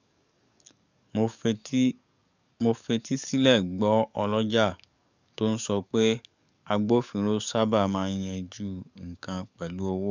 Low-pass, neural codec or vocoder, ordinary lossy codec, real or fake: 7.2 kHz; codec, 44.1 kHz, 7.8 kbps, DAC; none; fake